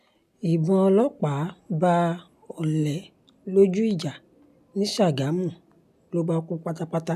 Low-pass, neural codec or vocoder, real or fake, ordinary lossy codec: 14.4 kHz; none; real; none